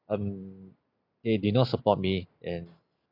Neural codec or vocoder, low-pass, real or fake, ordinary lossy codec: none; 5.4 kHz; real; none